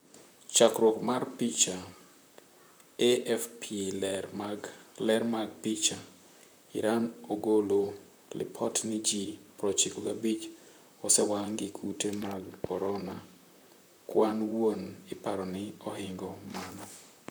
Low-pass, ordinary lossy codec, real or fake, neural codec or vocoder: none; none; fake; vocoder, 44.1 kHz, 128 mel bands, Pupu-Vocoder